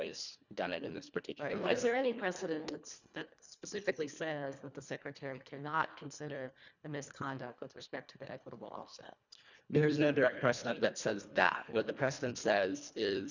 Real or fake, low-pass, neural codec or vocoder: fake; 7.2 kHz; codec, 24 kHz, 1.5 kbps, HILCodec